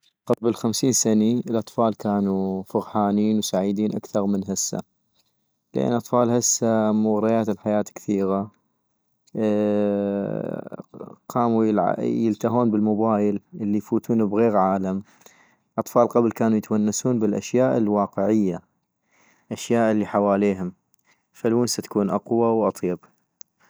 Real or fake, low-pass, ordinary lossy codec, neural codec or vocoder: real; none; none; none